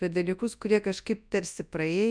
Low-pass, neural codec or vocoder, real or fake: 9.9 kHz; codec, 24 kHz, 0.9 kbps, WavTokenizer, large speech release; fake